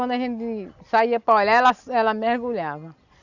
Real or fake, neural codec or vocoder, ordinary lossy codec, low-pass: real; none; none; 7.2 kHz